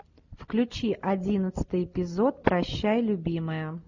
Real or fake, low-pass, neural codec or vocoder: real; 7.2 kHz; none